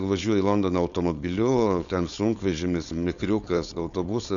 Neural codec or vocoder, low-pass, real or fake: codec, 16 kHz, 4.8 kbps, FACodec; 7.2 kHz; fake